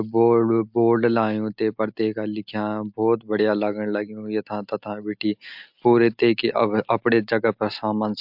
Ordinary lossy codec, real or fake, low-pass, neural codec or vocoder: MP3, 48 kbps; real; 5.4 kHz; none